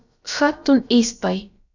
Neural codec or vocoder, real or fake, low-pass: codec, 16 kHz, about 1 kbps, DyCAST, with the encoder's durations; fake; 7.2 kHz